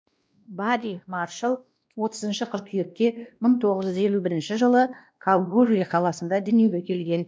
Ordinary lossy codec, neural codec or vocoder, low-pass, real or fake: none; codec, 16 kHz, 1 kbps, X-Codec, WavLM features, trained on Multilingual LibriSpeech; none; fake